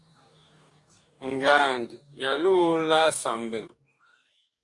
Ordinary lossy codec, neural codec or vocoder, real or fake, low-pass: Opus, 64 kbps; codec, 44.1 kHz, 2.6 kbps, DAC; fake; 10.8 kHz